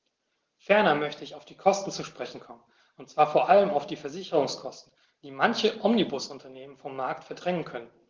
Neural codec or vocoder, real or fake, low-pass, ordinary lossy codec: none; real; 7.2 kHz; Opus, 16 kbps